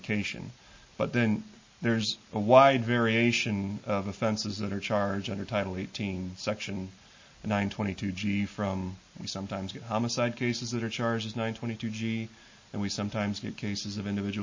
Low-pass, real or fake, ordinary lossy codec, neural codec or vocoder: 7.2 kHz; real; MP3, 32 kbps; none